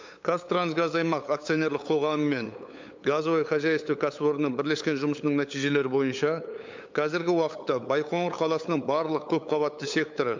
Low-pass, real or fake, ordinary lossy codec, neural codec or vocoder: 7.2 kHz; fake; MP3, 64 kbps; codec, 16 kHz, 8 kbps, FunCodec, trained on LibriTTS, 25 frames a second